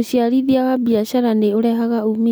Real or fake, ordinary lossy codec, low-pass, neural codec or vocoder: real; none; none; none